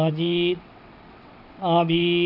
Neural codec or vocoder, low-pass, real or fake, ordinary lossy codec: vocoder, 22.05 kHz, 80 mel bands, WaveNeXt; 5.4 kHz; fake; none